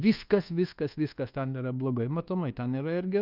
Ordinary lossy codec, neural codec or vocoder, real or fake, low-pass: Opus, 24 kbps; codec, 24 kHz, 1.2 kbps, DualCodec; fake; 5.4 kHz